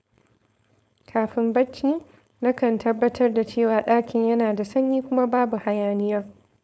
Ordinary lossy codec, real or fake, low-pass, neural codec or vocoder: none; fake; none; codec, 16 kHz, 4.8 kbps, FACodec